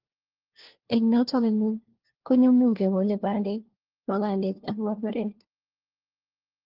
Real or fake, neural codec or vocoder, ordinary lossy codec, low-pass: fake; codec, 16 kHz, 1 kbps, FunCodec, trained on LibriTTS, 50 frames a second; Opus, 16 kbps; 5.4 kHz